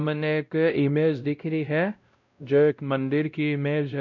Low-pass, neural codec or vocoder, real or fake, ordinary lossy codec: 7.2 kHz; codec, 16 kHz, 0.5 kbps, X-Codec, WavLM features, trained on Multilingual LibriSpeech; fake; none